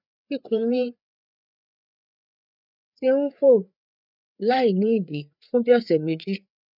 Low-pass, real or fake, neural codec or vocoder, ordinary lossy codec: 5.4 kHz; fake; codec, 16 kHz, 4 kbps, FreqCodec, larger model; none